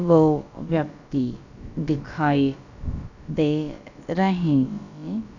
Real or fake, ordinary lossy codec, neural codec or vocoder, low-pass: fake; none; codec, 16 kHz, about 1 kbps, DyCAST, with the encoder's durations; 7.2 kHz